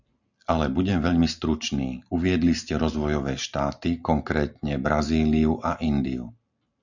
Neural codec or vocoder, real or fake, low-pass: none; real; 7.2 kHz